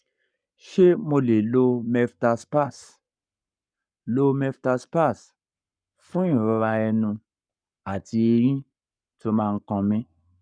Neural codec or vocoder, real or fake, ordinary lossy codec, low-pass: codec, 44.1 kHz, 7.8 kbps, Pupu-Codec; fake; none; 9.9 kHz